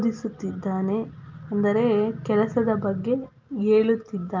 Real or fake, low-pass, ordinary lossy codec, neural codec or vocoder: real; 7.2 kHz; Opus, 24 kbps; none